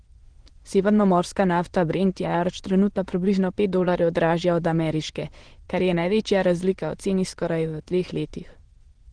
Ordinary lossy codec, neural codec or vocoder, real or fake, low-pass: Opus, 16 kbps; autoencoder, 22.05 kHz, a latent of 192 numbers a frame, VITS, trained on many speakers; fake; 9.9 kHz